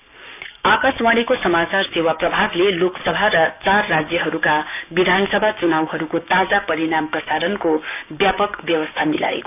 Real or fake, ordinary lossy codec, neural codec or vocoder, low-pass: fake; none; codec, 16 kHz, 6 kbps, DAC; 3.6 kHz